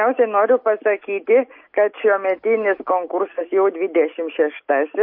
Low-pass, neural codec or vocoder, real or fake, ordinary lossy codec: 5.4 kHz; none; real; MP3, 32 kbps